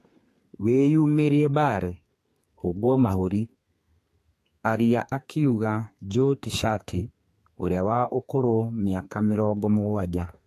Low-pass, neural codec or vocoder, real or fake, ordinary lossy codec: 14.4 kHz; codec, 32 kHz, 1.9 kbps, SNAC; fake; AAC, 64 kbps